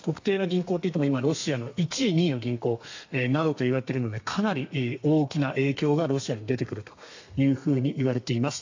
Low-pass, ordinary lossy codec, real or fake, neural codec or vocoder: 7.2 kHz; AAC, 48 kbps; fake; codec, 32 kHz, 1.9 kbps, SNAC